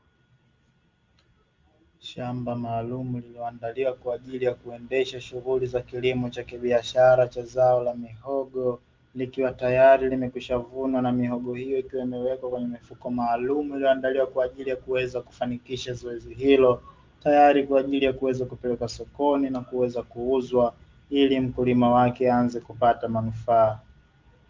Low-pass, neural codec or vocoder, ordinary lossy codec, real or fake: 7.2 kHz; none; Opus, 32 kbps; real